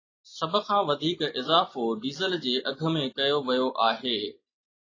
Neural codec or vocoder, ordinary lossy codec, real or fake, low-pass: none; AAC, 32 kbps; real; 7.2 kHz